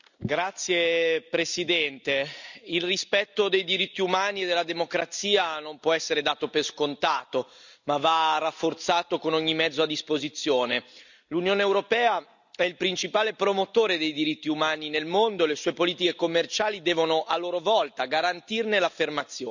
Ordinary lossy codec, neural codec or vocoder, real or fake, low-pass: none; none; real; 7.2 kHz